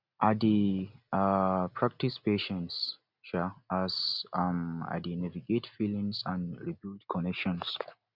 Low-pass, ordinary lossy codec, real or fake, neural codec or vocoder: 5.4 kHz; none; real; none